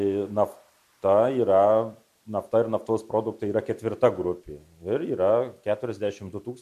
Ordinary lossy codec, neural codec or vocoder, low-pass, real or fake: MP3, 64 kbps; none; 14.4 kHz; real